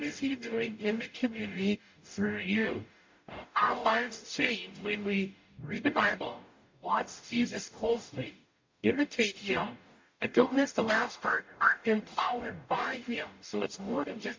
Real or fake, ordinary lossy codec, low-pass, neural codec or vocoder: fake; MP3, 48 kbps; 7.2 kHz; codec, 44.1 kHz, 0.9 kbps, DAC